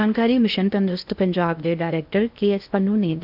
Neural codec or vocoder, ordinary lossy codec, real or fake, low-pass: codec, 16 kHz in and 24 kHz out, 0.8 kbps, FocalCodec, streaming, 65536 codes; none; fake; 5.4 kHz